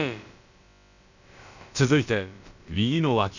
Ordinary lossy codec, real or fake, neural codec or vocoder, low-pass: none; fake; codec, 16 kHz, about 1 kbps, DyCAST, with the encoder's durations; 7.2 kHz